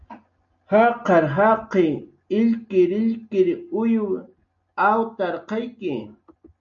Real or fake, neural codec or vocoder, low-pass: real; none; 7.2 kHz